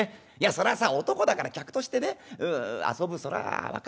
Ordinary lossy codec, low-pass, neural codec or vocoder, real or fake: none; none; none; real